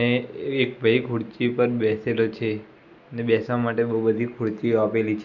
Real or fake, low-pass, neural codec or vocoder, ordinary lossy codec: real; 7.2 kHz; none; none